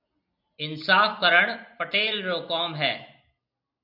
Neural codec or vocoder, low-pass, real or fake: none; 5.4 kHz; real